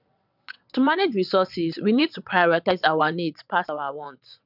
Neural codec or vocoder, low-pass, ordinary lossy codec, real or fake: vocoder, 22.05 kHz, 80 mel bands, WaveNeXt; 5.4 kHz; none; fake